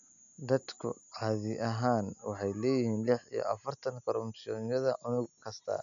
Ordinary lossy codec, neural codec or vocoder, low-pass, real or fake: none; none; 7.2 kHz; real